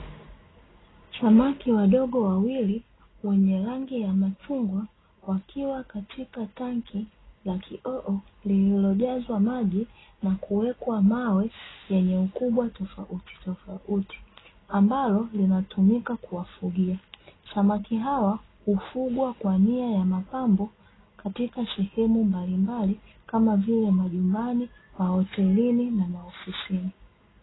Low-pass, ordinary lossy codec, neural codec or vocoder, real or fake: 7.2 kHz; AAC, 16 kbps; none; real